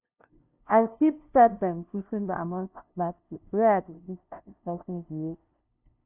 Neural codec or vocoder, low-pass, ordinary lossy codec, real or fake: codec, 16 kHz, 0.5 kbps, FunCodec, trained on LibriTTS, 25 frames a second; 3.6 kHz; none; fake